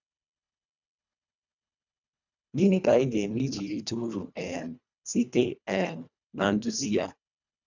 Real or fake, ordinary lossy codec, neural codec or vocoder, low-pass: fake; none; codec, 24 kHz, 1.5 kbps, HILCodec; 7.2 kHz